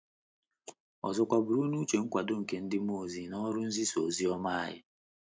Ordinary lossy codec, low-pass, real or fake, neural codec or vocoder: none; none; real; none